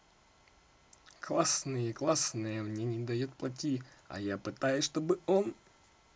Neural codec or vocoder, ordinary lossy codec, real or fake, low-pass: none; none; real; none